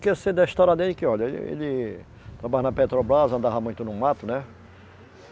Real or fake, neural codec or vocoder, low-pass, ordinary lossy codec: real; none; none; none